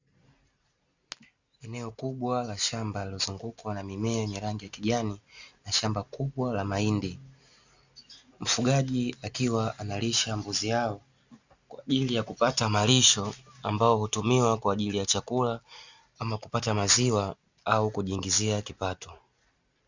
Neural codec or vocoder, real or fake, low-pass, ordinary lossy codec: none; real; 7.2 kHz; Opus, 64 kbps